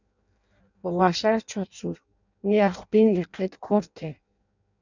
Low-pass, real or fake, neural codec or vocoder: 7.2 kHz; fake; codec, 16 kHz in and 24 kHz out, 0.6 kbps, FireRedTTS-2 codec